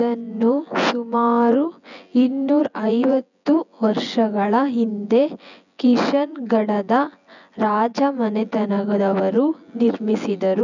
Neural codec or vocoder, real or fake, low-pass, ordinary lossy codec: vocoder, 24 kHz, 100 mel bands, Vocos; fake; 7.2 kHz; none